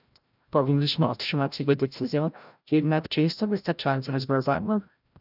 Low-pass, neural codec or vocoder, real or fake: 5.4 kHz; codec, 16 kHz, 0.5 kbps, FreqCodec, larger model; fake